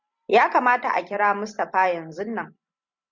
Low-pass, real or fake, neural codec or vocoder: 7.2 kHz; fake; vocoder, 44.1 kHz, 128 mel bands every 256 samples, BigVGAN v2